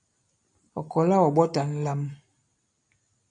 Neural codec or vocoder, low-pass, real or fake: none; 9.9 kHz; real